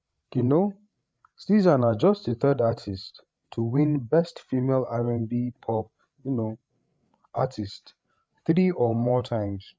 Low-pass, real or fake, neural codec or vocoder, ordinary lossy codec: none; fake; codec, 16 kHz, 8 kbps, FreqCodec, larger model; none